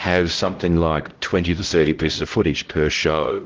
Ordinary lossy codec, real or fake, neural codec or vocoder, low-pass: Opus, 16 kbps; fake; codec, 16 kHz, 0.5 kbps, X-Codec, HuBERT features, trained on LibriSpeech; 7.2 kHz